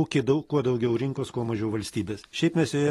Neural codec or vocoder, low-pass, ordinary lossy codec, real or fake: none; 19.8 kHz; AAC, 32 kbps; real